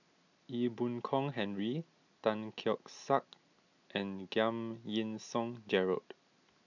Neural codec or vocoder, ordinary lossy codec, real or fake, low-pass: none; none; real; 7.2 kHz